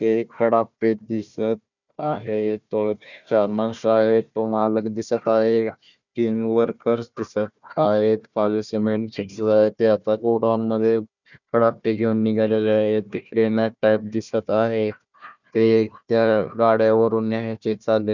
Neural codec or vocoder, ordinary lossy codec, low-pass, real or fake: codec, 16 kHz, 1 kbps, FunCodec, trained on Chinese and English, 50 frames a second; none; 7.2 kHz; fake